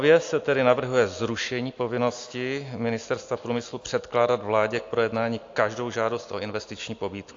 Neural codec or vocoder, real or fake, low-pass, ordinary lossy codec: none; real; 7.2 kHz; AAC, 48 kbps